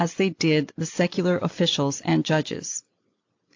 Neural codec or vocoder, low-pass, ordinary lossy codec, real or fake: none; 7.2 kHz; AAC, 48 kbps; real